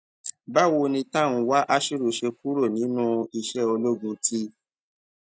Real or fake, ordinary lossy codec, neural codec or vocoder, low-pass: real; none; none; none